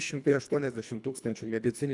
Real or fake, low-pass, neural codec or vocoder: fake; 10.8 kHz; codec, 24 kHz, 1.5 kbps, HILCodec